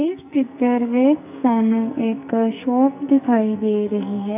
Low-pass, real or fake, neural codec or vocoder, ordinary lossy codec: 3.6 kHz; fake; codec, 44.1 kHz, 2.6 kbps, SNAC; AAC, 32 kbps